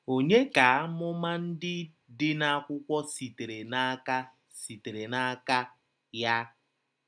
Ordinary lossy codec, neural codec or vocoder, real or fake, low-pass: none; none; real; 9.9 kHz